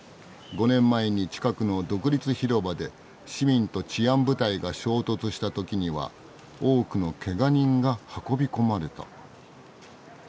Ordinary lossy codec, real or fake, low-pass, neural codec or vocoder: none; real; none; none